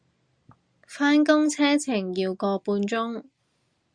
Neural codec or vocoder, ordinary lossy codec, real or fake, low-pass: none; Opus, 64 kbps; real; 9.9 kHz